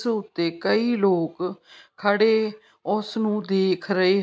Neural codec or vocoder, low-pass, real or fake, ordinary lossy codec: none; none; real; none